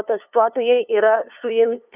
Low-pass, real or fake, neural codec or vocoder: 3.6 kHz; fake; codec, 16 kHz, 2 kbps, FunCodec, trained on LibriTTS, 25 frames a second